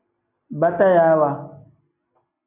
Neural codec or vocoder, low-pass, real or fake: none; 3.6 kHz; real